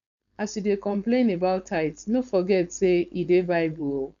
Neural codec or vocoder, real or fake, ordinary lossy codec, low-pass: codec, 16 kHz, 4.8 kbps, FACodec; fake; none; 7.2 kHz